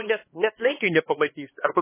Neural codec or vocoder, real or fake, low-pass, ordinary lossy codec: codec, 16 kHz, 2 kbps, X-Codec, HuBERT features, trained on LibriSpeech; fake; 3.6 kHz; MP3, 16 kbps